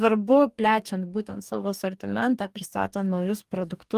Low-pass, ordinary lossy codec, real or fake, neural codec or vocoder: 14.4 kHz; Opus, 32 kbps; fake; codec, 44.1 kHz, 2.6 kbps, DAC